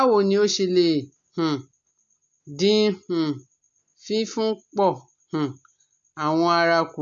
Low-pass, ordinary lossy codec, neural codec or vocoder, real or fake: 7.2 kHz; AAC, 64 kbps; none; real